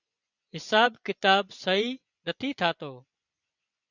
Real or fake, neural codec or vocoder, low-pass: fake; vocoder, 24 kHz, 100 mel bands, Vocos; 7.2 kHz